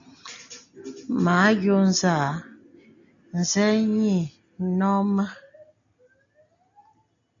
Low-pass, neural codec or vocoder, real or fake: 7.2 kHz; none; real